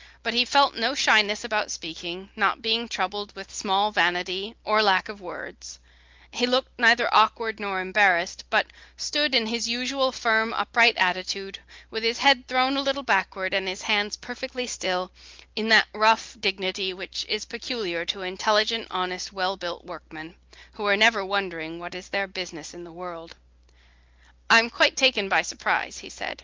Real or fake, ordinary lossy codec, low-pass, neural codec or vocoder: real; Opus, 32 kbps; 7.2 kHz; none